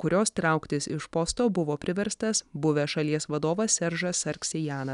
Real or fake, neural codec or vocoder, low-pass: real; none; 10.8 kHz